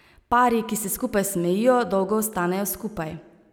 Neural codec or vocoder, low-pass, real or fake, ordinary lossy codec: none; none; real; none